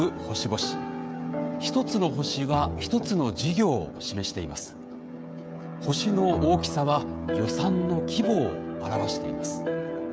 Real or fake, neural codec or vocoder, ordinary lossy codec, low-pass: fake; codec, 16 kHz, 16 kbps, FreqCodec, smaller model; none; none